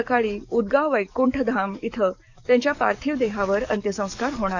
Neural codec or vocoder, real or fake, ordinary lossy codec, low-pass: codec, 44.1 kHz, 7.8 kbps, DAC; fake; none; 7.2 kHz